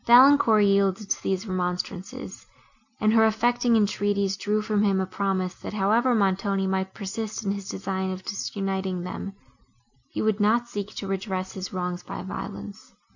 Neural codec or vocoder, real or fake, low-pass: none; real; 7.2 kHz